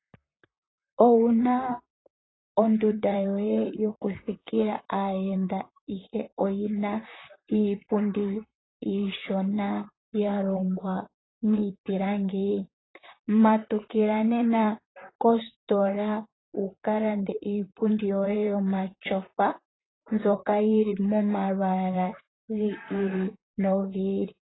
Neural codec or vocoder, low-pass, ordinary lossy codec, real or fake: vocoder, 44.1 kHz, 128 mel bands, Pupu-Vocoder; 7.2 kHz; AAC, 16 kbps; fake